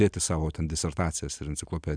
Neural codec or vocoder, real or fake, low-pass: none; real; 9.9 kHz